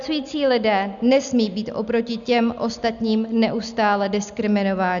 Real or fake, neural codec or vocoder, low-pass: real; none; 7.2 kHz